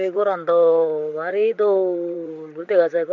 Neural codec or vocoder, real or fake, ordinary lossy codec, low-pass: vocoder, 44.1 kHz, 128 mel bands, Pupu-Vocoder; fake; none; 7.2 kHz